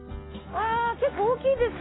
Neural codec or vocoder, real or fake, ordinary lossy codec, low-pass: none; real; AAC, 16 kbps; 7.2 kHz